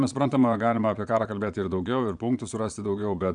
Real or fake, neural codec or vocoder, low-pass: fake; vocoder, 22.05 kHz, 80 mel bands, Vocos; 9.9 kHz